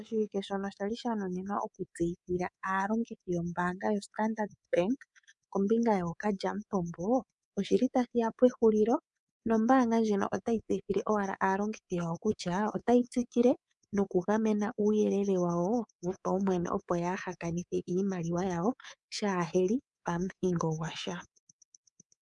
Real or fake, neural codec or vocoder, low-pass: fake; codec, 24 kHz, 3.1 kbps, DualCodec; 10.8 kHz